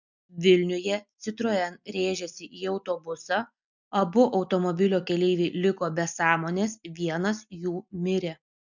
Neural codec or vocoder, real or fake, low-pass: none; real; 7.2 kHz